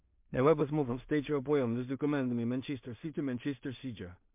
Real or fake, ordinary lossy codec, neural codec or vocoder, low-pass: fake; MP3, 32 kbps; codec, 16 kHz in and 24 kHz out, 0.4 kbps, LongCat-Audio-Codec, two codebook decoder; 3.6 kHz